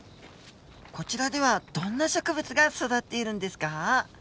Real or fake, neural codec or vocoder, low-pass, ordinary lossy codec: real; none; none; none